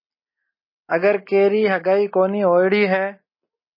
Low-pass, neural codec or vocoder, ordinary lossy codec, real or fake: 5.4 kHz; none; MP3, 24 kbps; real